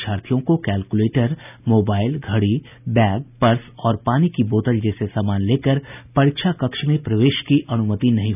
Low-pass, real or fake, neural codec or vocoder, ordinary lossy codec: 3.6 kHz; real; none; none